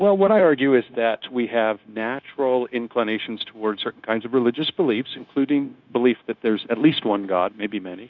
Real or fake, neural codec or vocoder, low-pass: fake; codec, 16 kHz, 0.9 kbps, LongCat-Audio-Codec; 7.2 kHz